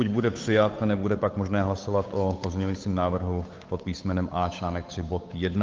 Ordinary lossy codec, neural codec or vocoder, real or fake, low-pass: Opus, 32 kbps; codec, 16 kHz, 8 kbps, FunCodec, trained on Chinese and English, 25 frames a second; fake; 7.2 kHz